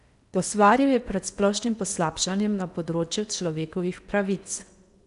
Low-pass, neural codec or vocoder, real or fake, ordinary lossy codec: 10.8 kHz; codec, 16 kHz in and 24 kHz out, 0.8 kbps, FocalCodec, streaming, 65536 codes; fake; none